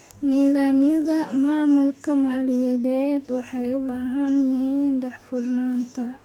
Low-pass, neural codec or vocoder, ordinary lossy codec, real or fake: 19.8 kHz; codec, 44.1 kHz, 2.6 kbps, DAC; MP3, 96 kbps; fake